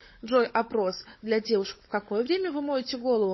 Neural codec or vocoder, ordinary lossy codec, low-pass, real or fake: codec, 16 kHz, 16 kbps, FunCodec, trained on LibriTTS, 50 frames a second; MP3, 24 kbps; 7.2 kHz; fake